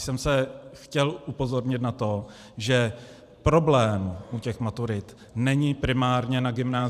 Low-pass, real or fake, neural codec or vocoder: 14.4 kHz; fake; vocoder, 44.1 kHz, 128 mel bands every 512 samples, BigVGAN v2